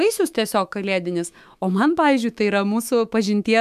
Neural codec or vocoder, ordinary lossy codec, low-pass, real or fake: autoencoder, 48 kHz, 128 numbers a frame, DAC-VAE, trained on Japanese speech; MP3, 96 kbps; 14.4 kHz; fake